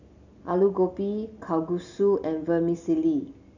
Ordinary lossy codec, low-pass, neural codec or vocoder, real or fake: none; 7.2 kHz; none; real